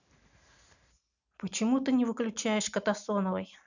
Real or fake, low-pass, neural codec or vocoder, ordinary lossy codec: real; 7.2 kHz; none; none